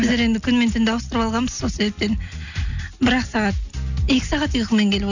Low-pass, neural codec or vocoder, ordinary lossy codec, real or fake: 7.2 kHz; none; none; real